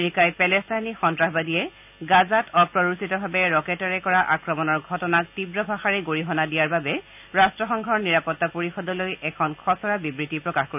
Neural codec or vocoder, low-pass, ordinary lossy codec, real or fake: none; 3.6 kHz; none; real